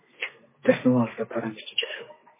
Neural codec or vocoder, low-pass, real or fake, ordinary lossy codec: codec, 32 kHz, 1.9 kbps, SNAC; 3.6 kHz; fake; MP3, 16 kbps